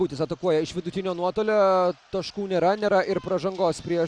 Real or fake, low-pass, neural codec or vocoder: real; 9.9 kHz; none